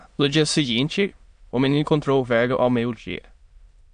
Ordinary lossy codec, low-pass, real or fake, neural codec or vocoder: AAC, 64 kbps; 9.9 kHz; fake; autoencoder, 22.05 kHz, a latent of 192 numbers a frame, VITS, trained on many speakers